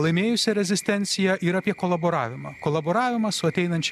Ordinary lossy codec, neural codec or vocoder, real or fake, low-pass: MP3, 96 kbps; none; real; 14.4 kHz